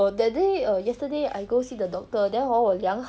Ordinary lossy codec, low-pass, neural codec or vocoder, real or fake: none; none; none; real